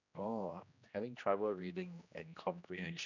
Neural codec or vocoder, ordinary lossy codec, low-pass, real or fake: codec, 16 kHz, 1 kbps, X-Codec, HuBERT features, trained on general audio; none; 7.2 kHz; fake